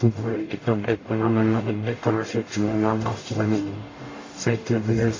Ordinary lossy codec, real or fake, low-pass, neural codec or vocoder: AAC, 32 kbps; fake; 7.2 kHz; codec, 44.1 kHz, 0.9 kbps, DAC